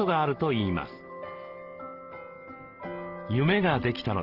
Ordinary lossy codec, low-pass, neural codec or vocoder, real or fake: Opus, 16 kbps; 5.4 kHz; none; real